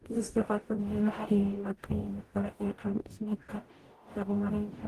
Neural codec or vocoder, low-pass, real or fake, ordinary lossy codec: codec, 44.1 kHz, 0.9 kbps, DAC; 14.4 kHz; fake; Opus, 24 kbps